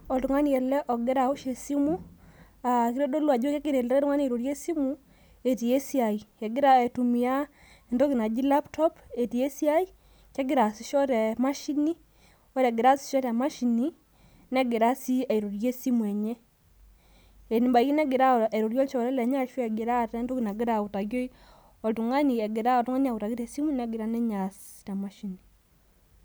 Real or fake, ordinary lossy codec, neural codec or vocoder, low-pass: real; none; none; none